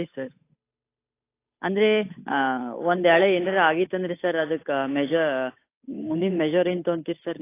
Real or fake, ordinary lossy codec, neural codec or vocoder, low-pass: fake; AAC, 24 kbps; codec, 16 kHz, 8 kbps, FunCodec, trained on Chinese and English, 25 frames a second; 3.6 kHz